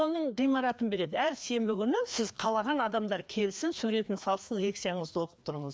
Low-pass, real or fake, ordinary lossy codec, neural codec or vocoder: none; fake; none; codec, 16 kHz, 2 kbps, FreqCodec, larger model